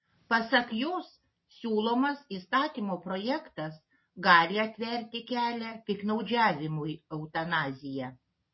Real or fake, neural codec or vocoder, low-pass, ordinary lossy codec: real; none; 7.2 kHz; MP3, 24 kbps